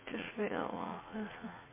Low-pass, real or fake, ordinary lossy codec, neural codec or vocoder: 3.6 kHz; fake; MP3, 16 kbps; vocoder, 44.1 kHz, 80 mel bands, Vocos